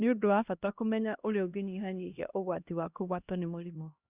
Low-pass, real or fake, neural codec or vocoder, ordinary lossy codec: 3.6 kHz; fake; codec, 16 kHz, 1 kbps, X-Codec, HuBERT features, trained on LibriSpeech; Opus, 64 kbps